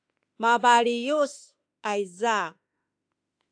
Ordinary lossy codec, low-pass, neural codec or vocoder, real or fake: AAC, 64 kbps; 9.9 kHz; autoencoder, 48 kHz, 32 numbers a frame, DAC-VAE, trained on Japanese speech; fake